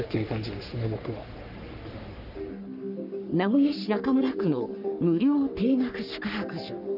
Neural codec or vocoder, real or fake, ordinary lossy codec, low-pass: codec, 44.1 kHz, 3.4 kbps, Pupu-Codec; fake; none; 5.4 kHz